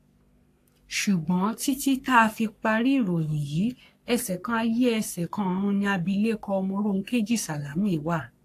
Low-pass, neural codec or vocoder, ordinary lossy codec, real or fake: 14.4 kHz; codec, 44.1 kHz, 3.4 kbps, Pupu-Codec; AAC, 64 kbps; fake